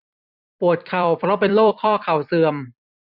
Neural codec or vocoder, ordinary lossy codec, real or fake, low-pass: vocoder, 44.1 kHz, 128 mel bands every 256 samples, BigVGAN v2; none; fake; 5.4 kHz